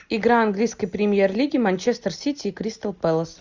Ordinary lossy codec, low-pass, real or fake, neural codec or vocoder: Opus, 64 kbps; 7.2 kHz; real; none